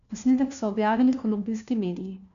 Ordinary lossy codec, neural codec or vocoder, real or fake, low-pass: none; codec, 16 kHz, 1 kbps, FunCodec, trained on LibriTTS, 50 frames a second; fake; 7.2 kHz